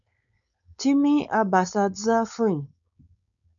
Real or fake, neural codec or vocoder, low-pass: fake; codec, 16 kHz, 4.8 kbps, FACodec; 7.2 kHz